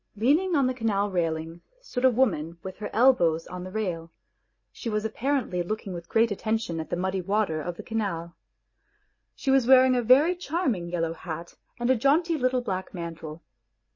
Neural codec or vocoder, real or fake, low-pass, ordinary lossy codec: none; real; 7.2 kHz; MP3, 32 kbps